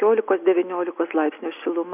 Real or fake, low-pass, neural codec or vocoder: real; 3.6 kHz; none